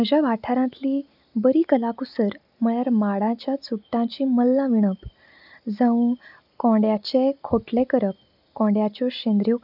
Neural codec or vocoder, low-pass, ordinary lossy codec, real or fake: none; 5.4 kHz; none; real